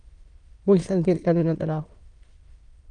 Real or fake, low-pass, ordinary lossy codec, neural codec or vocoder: fake; 9.9 kHz; Opus, 32 kbps; autoencoder, 22.05 kHz, a latent of 192 numbers a frame, VITS, trained on many speakers